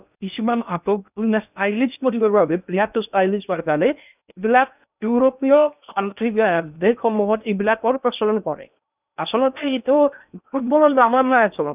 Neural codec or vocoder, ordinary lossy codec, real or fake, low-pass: codec, 16 kHz in and 24 kHz out, 0.6 kbps, FocalCodec, streaming, 2048 codes; none; fake; 3.6 kHz